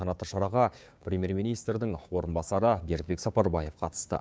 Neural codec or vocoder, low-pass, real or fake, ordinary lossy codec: codec, 16 kHz, 6 kbps, DAC; none; fake; none